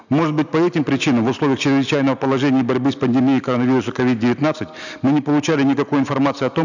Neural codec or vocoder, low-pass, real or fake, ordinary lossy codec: none; 7.2 kHz; real; none